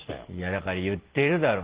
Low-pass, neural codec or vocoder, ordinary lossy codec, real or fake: 3.6 kHz; none; Opus, 16 kbps; real